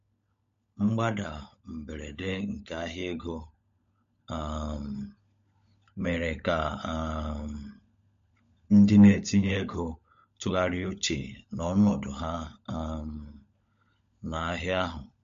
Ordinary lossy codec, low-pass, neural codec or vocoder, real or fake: MP3, 48 kbps; 7.2 kHz; codec, 16 kHz, 16 kbps, FunCodec, trained on LibriTTS, 50 frames a second; fake